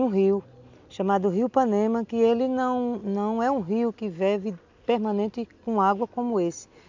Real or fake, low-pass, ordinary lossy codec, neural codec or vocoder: real; 7.2 kHz; MP3, 64 kbps; none